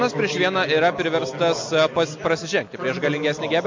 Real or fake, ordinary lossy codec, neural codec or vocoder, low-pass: real; MP3, 48 kbps; none; 7.2 kHz